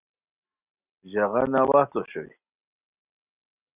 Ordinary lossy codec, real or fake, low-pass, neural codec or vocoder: Opus, 64 kbps; real; 3.6 kHz; none